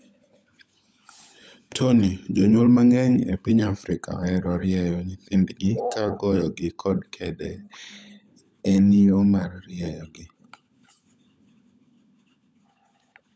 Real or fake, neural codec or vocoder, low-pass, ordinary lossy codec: fake; codec, 16 kHz, 16 kbps, FunCodec, trained on LibriTTS, 50 frames a second; none; none